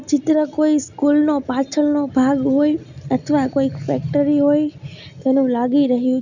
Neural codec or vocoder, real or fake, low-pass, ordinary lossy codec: none; real; 7.2 kHz; none